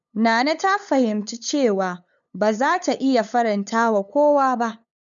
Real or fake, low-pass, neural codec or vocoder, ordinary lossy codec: fake; 7.2 kHz; codec, 16 kHz, 8 kbps, FunCodec, trained on LibriTTS, 25 frames a second; none